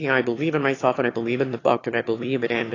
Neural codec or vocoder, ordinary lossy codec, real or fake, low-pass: autoencoder, 22.05 kHz, a latent of 192 numbers a frame, VITS, trained on one speaker; AAC, 32 kbps; fake; 7.2 kHz